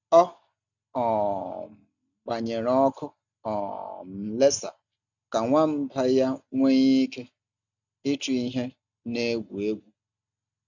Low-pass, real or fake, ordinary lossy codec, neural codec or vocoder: 7.2 kHz; real; none; none